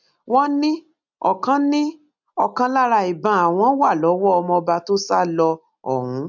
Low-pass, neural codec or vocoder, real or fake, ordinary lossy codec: 7.2 kHz; none; real; none